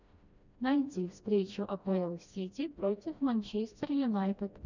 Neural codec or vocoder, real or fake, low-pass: codec, 16 kHz, 1 kbps, FreqCodec, smaller model; fake; 7.2 kHz